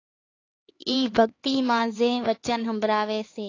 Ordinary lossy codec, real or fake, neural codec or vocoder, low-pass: AAC, 32 kbps; fake; codec, 16 kHz, 4 kbps, X-Codec, HuBERT features, trained on LibriSpeech; 7.2 kHz